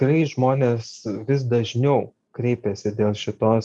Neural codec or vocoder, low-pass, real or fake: none; 10.8 kHz; real